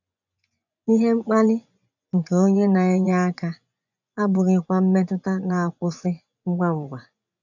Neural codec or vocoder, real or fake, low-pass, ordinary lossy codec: vocoder, 24 kHz, 100 mel bands, Vocos; fake; 7.2 kHz; none